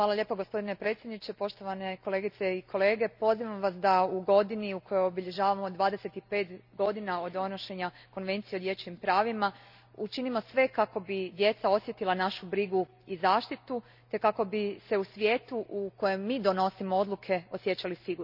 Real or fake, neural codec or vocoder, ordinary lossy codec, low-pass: real; none; none; 5.4 kHz